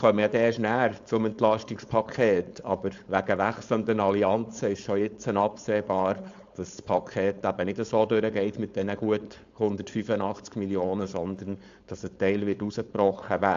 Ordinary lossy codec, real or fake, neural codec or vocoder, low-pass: AAC, 64 kbps; fake; codec, 16 kHz, 4.8 kbps, FACodec; 7.2 kHz